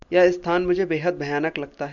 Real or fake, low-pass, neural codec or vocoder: real; 7.2 kHz; none